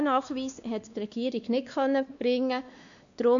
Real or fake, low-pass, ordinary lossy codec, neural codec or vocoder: fake; 7.2 kHz; none; codec, 16 kHz, 2 kbps, X-Codec, WavLM features, trained on Multilingual LibriSpeech